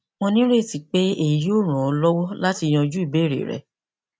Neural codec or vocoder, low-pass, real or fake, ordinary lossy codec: none; none; real; none